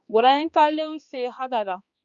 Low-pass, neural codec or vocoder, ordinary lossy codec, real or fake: 7.2 kHz; codec, 16 kHz, 2 kbps, X-Codec, HuBERT features, trained on balanced general audio; Opus, 64 kbps; fake